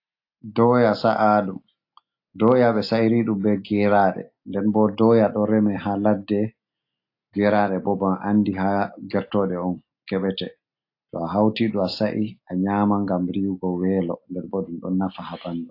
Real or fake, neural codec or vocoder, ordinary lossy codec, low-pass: real; none; AAC, 32 kbps; 5.4 kHz